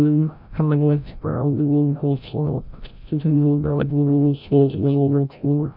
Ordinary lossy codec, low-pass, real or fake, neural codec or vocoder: none; 5.4 kHz; fake; codec, 16 kHz, 0.5 kbps, FreqCodec, larger model